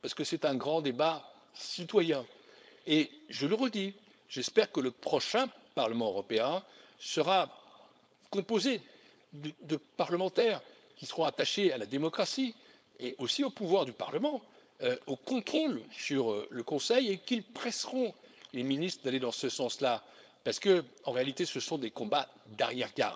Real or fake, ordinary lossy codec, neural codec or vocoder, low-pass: fake; none; codec, 16 kHz, 4.8 kbps, FACodec; none